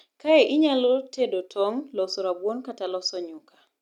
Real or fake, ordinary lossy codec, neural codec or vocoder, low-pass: real; none; none; 19.8 kHz